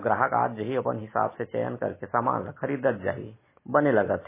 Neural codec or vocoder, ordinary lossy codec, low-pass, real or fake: none; MP3, 16 kbps; 3.6 kHz; real